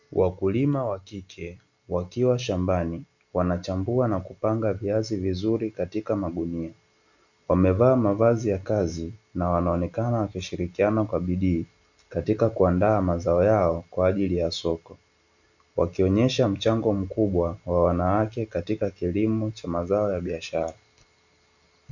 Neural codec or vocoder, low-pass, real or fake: none; 7.2 kHz; real